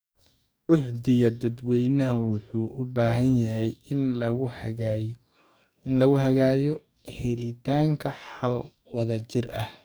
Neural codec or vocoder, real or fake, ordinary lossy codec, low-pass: codec, 44.1 kHz, 2.6 kbps, DAC; fake; none; none